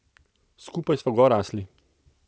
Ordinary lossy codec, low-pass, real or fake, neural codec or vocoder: none; none; real; none